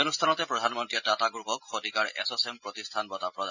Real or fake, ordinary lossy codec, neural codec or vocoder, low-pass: real; none; none; 7.2 kHz